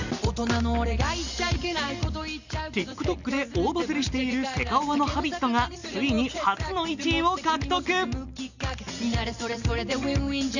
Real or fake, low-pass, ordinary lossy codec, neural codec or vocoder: real; 7.2 kHz; none; none